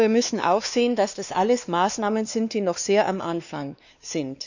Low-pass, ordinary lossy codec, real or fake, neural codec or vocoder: 7.2 kHz; none; fake; codec, 16 kHz, 2 kbps, X-Codec, WavLM features, trained on Multilingual LibriSpeech